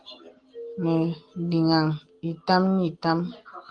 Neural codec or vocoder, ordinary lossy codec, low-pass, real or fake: none; Opus, 24 kbps; 9.9 kHz; real